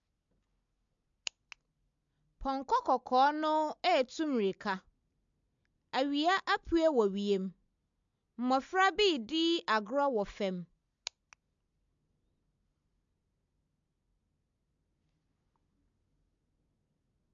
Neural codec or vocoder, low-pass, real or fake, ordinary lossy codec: none; 7.2 kHz; real; MP3, 64 kbps